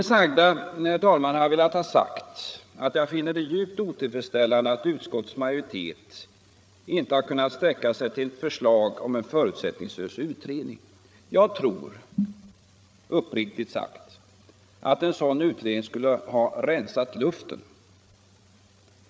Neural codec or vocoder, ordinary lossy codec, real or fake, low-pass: codec, 16 kHz, 8 kbps, FreqCodec, larger model; none; fake; none